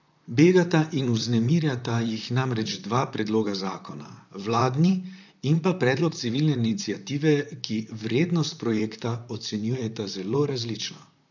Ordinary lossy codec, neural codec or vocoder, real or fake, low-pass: none; vocoder, 44.1 kHz, 128 mel bands, Pupu-Vocoder; fake; 7.2 kHz